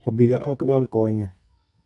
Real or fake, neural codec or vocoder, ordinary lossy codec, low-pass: fake; codec, 24 kHz, 0.9 kbps, WavTokenizer, medium music audio release; none; 10.8 kHz